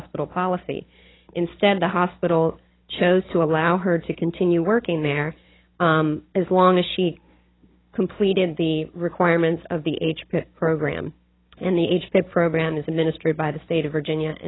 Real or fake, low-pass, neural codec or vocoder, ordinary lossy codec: fake; 7.2 kHz; codec, 16 kHz, 16 kbps, FunCodec, trained on Chinese and English, 50 frames a second; AAC, 16 kbps